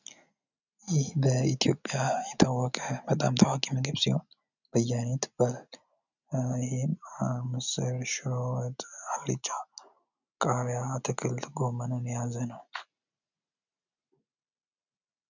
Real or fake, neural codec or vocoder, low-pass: real; none; 7.2 kHz